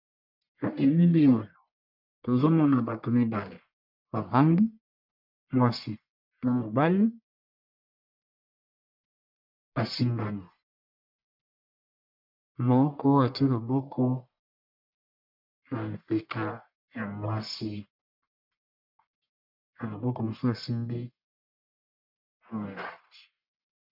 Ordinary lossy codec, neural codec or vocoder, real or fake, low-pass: MP3, 48 kbps; codec, 44.1 kHz, 1.7 kbps, Pupu-Codec; fake; 5.4 kHz